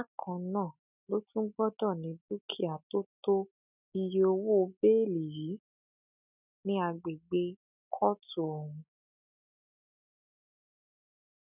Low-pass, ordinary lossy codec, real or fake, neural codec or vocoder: 3.6 kHz; none; real; none